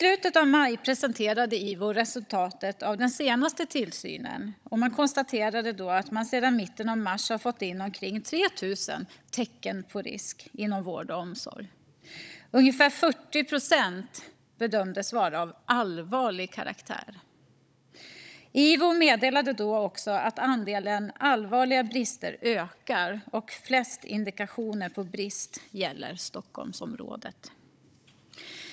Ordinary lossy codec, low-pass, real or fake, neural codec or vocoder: none; none; fake; codec, 16 kHz, 16 kbps, FunCodec, trained on Chinese and English, 50 frames a second